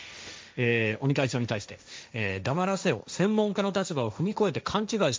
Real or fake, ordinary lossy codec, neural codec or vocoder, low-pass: fake; none; codec, 16 kHz, 1.1 kbps, Voila-Tokenizer; none